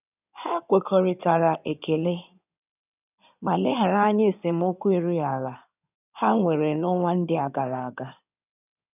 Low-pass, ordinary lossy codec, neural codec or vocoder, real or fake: 3.6 kHz; none; codec, 16 kHz in and 24 kHz out, 2.2 kbps, FireRedTTS-2 codec; fake